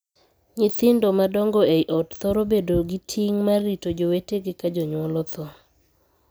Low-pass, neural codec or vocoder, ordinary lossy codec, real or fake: none; none; none; real